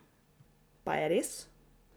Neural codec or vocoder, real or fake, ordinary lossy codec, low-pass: none; real; none; none